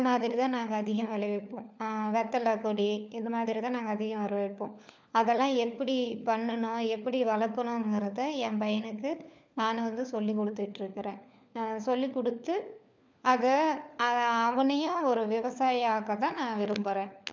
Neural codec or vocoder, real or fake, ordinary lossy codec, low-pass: codec, 16 kHz, 4 kbps, FunCodec, trained on LibriTTS, 50 frames a second; fake; none; none